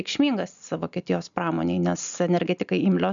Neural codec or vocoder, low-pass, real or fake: none; 7.2 kHz; real